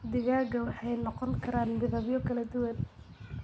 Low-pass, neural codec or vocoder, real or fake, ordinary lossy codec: none; none; real; none